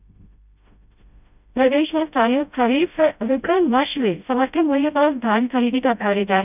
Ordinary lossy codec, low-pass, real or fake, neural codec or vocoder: none; 3.6 kHz; fake; codec, 16 kHz, 0.5 kbps, FreqCodec, smaller model